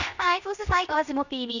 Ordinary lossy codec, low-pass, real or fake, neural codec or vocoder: none; 7.2 kHz; fake; codec, 16 kHz, 0.7 kbps, FocalCodec